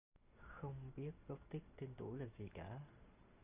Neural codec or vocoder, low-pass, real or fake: none; 3.6 kHz; real